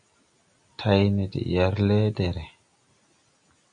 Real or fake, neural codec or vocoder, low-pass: real; none; 9.9 kHz